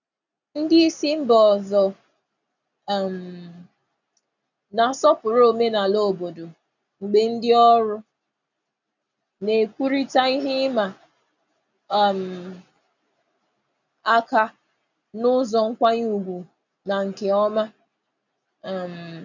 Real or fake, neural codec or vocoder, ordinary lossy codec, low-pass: real; none; none; 7.2 kHz